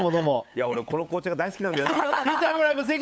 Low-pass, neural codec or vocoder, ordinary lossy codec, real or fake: none; codec, 16 kHz, 8 kbps, FunCodec, trained on LibriTTS, 25 frames a second; none; fake